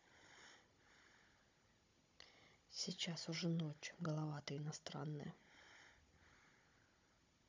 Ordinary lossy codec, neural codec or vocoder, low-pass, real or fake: MP3, 64 kbps; codec, 16 kHz, 16 kbps, FunCodec, trained on Chinese and English, 50 frames a second; 7.2 kHz; fake